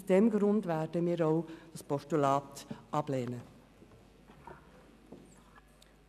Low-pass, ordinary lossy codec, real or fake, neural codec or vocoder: 14.4 kHz; none; real; none